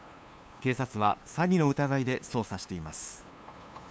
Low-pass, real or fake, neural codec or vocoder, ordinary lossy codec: none; fake; codec, 16 kHz, 2 kbps, FunCodec, trained on LibriTTS, 25 frames a second; none